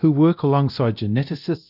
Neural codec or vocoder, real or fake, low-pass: codec, 24 kHz, 0.9 kbps, DualCodec; fake; 5.4 kHz